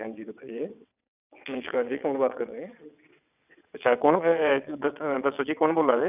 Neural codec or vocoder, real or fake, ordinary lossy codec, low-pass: vocoder, 22.05 kHz, 80 mel bands, WaveNeXt; fake; none; 3.6 kHz